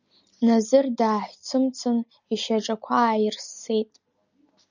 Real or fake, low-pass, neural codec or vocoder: real; 7.2 kHz; none